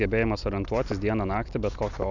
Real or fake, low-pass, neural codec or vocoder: real; 7.2 kHz; none